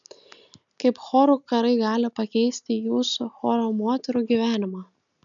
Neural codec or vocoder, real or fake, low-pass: none; real; 7.2 kHz